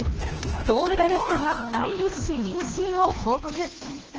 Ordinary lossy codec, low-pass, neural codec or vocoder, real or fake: Opus, 16 kbps; 7.2 kHz; codec, 16 kHz, 1 kbps, FunCodec, trained on LibriTTS, 50 frames a second; fake